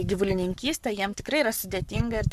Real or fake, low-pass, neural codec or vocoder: fake; 14.4 kHz; codec, 44.1 kHz, 7.8 kbps, Pupu-Codec